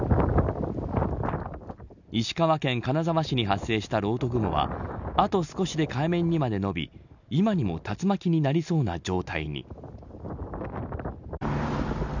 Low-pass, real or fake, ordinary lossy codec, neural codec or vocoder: 7.2 kHz; real; none; none